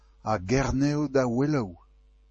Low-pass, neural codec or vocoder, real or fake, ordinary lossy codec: 10.8 kHz; none; real; MP3, 32 kbps